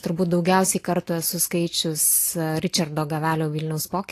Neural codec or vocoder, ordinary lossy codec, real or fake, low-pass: none; AAC, 48 kbps; real; 14.4 kHz